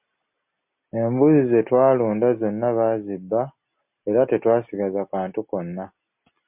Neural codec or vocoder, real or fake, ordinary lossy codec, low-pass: none; real; MP3, 32 kbps; 3.6 kHz